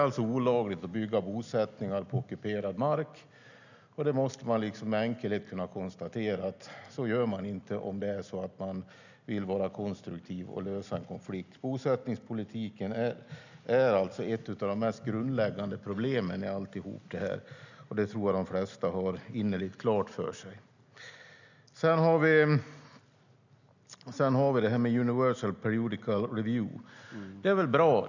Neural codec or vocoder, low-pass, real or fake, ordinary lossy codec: none; 7.2 kHz; real; none